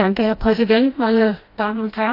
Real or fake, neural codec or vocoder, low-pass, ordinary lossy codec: fake; codec, 16 kHz, 1 kbps, FreqCodec, smaller model; 5.4 kHz; AAC, 32 kbps